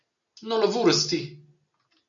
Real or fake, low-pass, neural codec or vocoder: real; 7.2 kHz; none